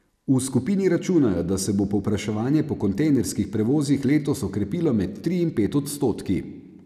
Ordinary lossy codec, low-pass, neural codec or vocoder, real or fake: none; 14.4 kHz; none; real